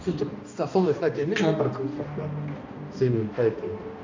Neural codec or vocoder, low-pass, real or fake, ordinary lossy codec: codec, 16 kHz, 1 kbps, X-Codec, HuBERT features, trained on balanced general audio; 7.2 kHz; fake; AAC, 48 kbps